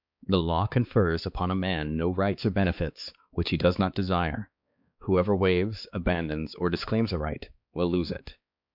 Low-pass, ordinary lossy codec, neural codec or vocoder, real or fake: 5.4 kHz; MP3, 48 kbps; codec, 16 kHz, 4 kbps, X-Codec, HuBERT features, trained on balanced general audio; fake